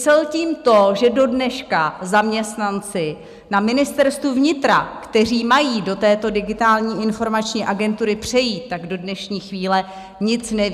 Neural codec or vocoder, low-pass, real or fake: none; 14.4 kHz; real